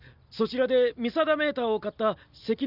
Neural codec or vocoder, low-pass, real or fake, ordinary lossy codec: none; 5.4 kHz; real; none